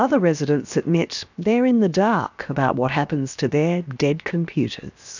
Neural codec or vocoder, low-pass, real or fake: codec, 16 kHz, 0.7 kbps, FocalCodec; 7.2 kHz; fake